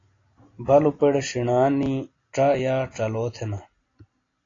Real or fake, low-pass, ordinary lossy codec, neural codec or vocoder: real; 7.2 kHz; AAC, 32 kbps; none